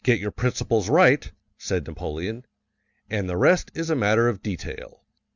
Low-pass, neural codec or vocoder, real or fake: 7.2 kHz; none; real